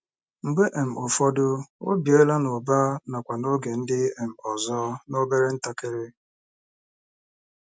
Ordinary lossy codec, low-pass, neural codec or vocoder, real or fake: none; none; none; real